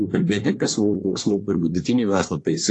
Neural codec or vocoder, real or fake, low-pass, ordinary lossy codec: codec, 24 kHz, 1 kbps, SNAC; fake; 10.8 kHz; AAC, 48 kbps